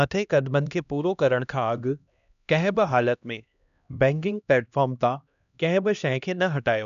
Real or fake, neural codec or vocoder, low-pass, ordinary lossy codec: fake; codec, 16 kHz, 1 kbps, X-Codec, HuBERT features, trained on LibriSpeech; 7.2 kHz; none